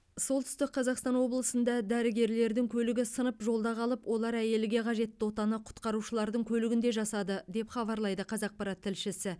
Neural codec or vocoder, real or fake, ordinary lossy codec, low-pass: none; real; none; none